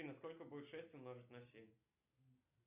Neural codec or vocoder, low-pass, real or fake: none; 3.6 kHz; real